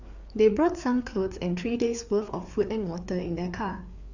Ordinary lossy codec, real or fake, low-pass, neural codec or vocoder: none; fake; 7.2 kHz; codec, 16 kHz, 4 kbps, FreqCodec, larger model